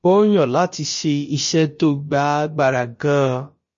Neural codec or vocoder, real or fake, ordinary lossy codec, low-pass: codec, 16 kHz, about 1 kbps, DyCAST, with the encoder's durations; fake; MP3, 32 kbps; 7.2 kHz